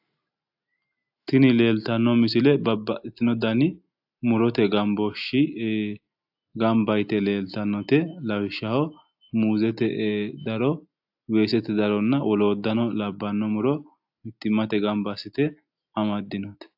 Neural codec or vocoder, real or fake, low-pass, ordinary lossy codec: none; real; 5.4 kHz; AAC, 48 kbps